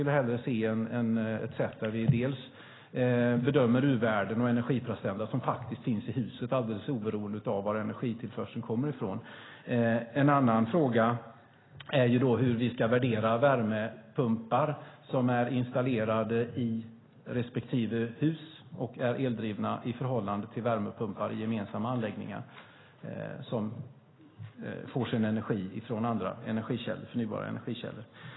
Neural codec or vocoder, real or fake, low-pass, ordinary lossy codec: none; real; 7.2 kHz; AAC, 16 kbps